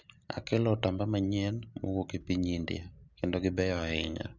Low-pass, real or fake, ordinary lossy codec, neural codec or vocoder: 7.2 kHz; real; none; none